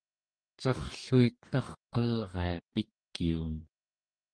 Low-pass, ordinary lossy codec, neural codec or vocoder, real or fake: 9.9 kHz; Opus, 32 kbps; codec, 44.1 kHz, 2.6 kbps, DAC; fake